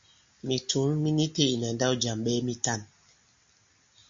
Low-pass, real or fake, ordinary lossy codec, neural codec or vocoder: 7.2 kHz; real; MP3, 48 kbps; none